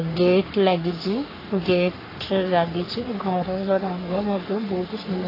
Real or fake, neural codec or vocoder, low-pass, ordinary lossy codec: fake; codec, 44.1 kHz, 3.4 kbps, Pupu-Codec; 5.4 kHz; AAC, 24 kbps